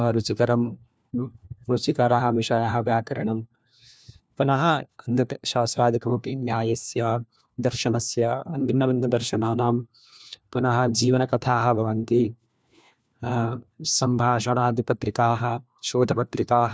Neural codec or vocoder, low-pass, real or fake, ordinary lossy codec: codec, 16 kHz, 1 kbps, FunCodec, trained on LibriTTS, 50 frames a second; none; fake; none